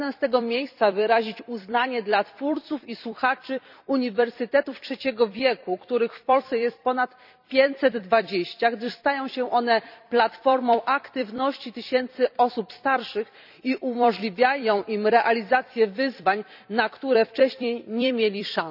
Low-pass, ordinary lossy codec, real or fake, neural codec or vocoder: 5.4 kHz; none; fake; vocoder, 44.1 kHz, 128 mel bands every 256 samples, BigVGAN v2